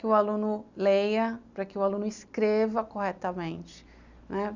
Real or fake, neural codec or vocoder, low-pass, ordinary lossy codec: real; none; 7.2 kHz; Opus, 64 kbps